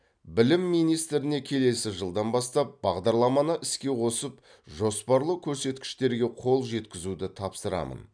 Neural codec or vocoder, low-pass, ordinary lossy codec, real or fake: none; 9.9 kHz; none; real